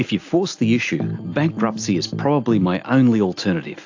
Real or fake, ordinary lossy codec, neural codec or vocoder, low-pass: real; AAC, 48 kbps; none; 7.2 kHz